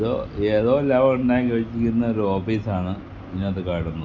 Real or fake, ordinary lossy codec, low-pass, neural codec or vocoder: real; none; 7.2 kHz; none